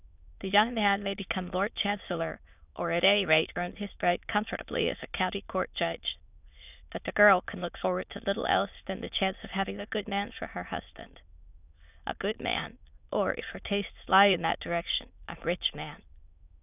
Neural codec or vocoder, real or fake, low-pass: autoencoder, 22.05 kHz, a latent of 192 numbers a frame, VITS, trained on many speakers; fake; 3.6 kHz